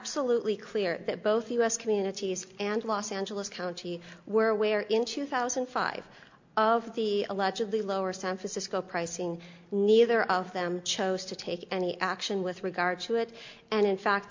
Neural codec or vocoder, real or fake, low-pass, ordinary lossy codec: none; real; 7.2 kHz; MP3, 48 kbps